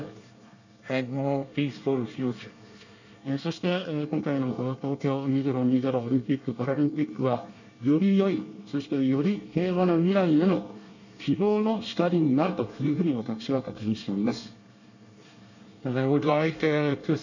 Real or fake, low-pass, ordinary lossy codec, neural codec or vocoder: fake; 7.2 kHz; none; codec, 24 kHz, 1 kbps, SNAC